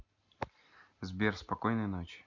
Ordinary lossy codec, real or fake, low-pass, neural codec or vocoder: none; real; 7.2 kHz; none